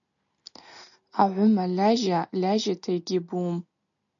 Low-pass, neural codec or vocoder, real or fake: 7.2 kHz; none; real